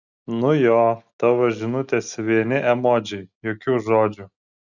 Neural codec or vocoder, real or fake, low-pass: none; real; 7.2 kHz